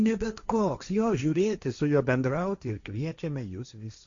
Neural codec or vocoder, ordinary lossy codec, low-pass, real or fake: codec, 16 kHz, 1.1 kbps, Voila-Tokenizer; Opus, 64 kbps; 7.2 kHz; fake